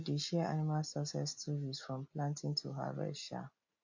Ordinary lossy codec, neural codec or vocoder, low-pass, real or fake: MP3, 48 kbps; none; 7.2 kHz; real